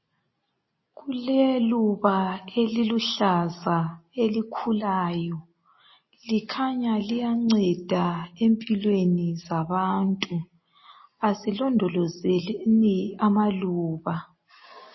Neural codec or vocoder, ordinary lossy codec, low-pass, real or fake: none; MP3, 24 kbps; 7.2 kHz; real